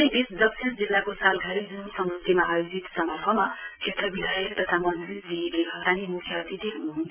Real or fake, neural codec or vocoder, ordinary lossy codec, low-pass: fake; vocoder, 22.05 kHz, 80 mel bands, Vocos; none; 3.6 kHz